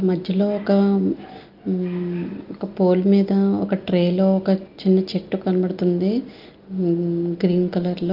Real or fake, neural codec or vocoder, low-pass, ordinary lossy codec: real; none; 5.4 kHz; Opus, 24 kbps